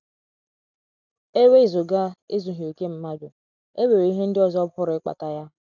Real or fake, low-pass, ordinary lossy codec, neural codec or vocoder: real; 7.2 kHz; none; none